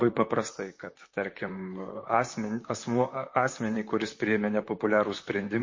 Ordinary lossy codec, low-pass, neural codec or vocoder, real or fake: MP3, 32 kbps; 7.2 kHz; vocoder, 44.1 kHz, 128 mel bands, Pupu-Vocoder; fake